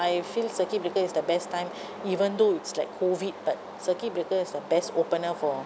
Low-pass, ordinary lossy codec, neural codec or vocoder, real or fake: none; none; none; real